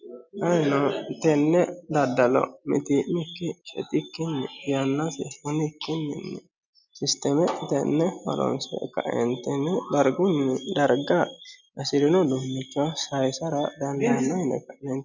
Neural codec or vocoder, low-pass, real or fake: none; 7.2 kHz; real